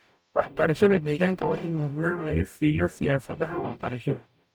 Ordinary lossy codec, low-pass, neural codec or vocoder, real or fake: none; none; codec, 44.1 kHz, 0.9 kbps, DAC; fake